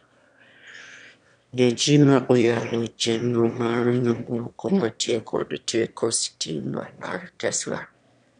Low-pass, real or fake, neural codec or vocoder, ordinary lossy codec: 9.9 kHz; fake; autoencoder, 22.05 kHz, a latent of 192 numbers a frame, VITS, trained on one speaker; none